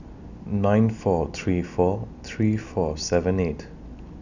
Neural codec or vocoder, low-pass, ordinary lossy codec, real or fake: none; 7.2 kHz; none; real